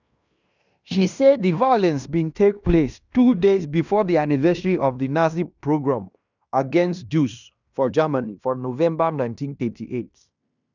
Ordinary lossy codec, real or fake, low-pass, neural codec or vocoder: none; fake; 7.2 kHz; codec, 16 kHz in and 24 kHz out, 0.9 kbps, LongCat-Audio-Codec, fine tuned four codebook decoder